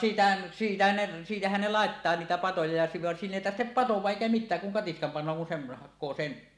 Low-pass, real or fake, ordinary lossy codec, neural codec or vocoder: none; real; none; none